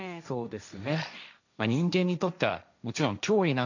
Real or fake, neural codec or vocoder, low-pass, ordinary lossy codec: fake; codec, 16 kHz, 1.1 kbps, Voila-Tokenizer; 7.2 kHz; none